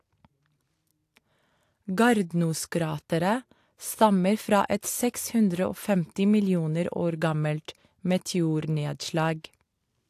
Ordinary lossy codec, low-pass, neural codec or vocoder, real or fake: AAC, 64 kbps; 14.4 kHz; none; real